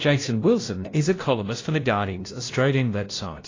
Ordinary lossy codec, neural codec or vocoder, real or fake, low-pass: AAC, 32 kbps; codec, 16 kHz, 0.5 kbps, FunCodec, trained on LibriTTS, 25 frames a second; fake; 7.2 kHz